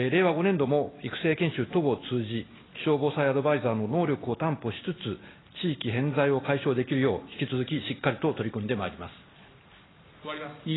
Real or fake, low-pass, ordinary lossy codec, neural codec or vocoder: real; 7.2 kHz; AAC, 16 kbps; none